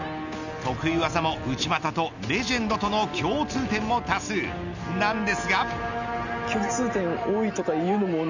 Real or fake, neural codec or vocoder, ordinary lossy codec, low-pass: real; none; none; 7.2 kHz